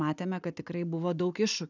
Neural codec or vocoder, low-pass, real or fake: none; 7.2 kHz; real